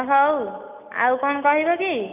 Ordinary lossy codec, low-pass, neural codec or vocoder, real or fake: none; 3.6 kHz; none; real